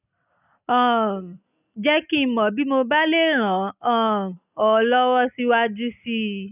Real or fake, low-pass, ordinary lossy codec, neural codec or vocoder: real; 3.6 kHz; none; none